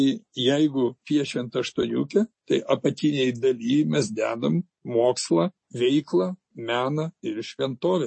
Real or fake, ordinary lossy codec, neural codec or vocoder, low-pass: real; MP3, 32 kbps; none; 9.9 kHz